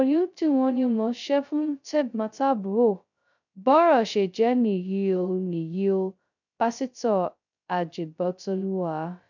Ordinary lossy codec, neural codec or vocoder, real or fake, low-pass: none; codec, 16 kHz, 0.2 kbps, FocalCodec; fake; 7.2 kHz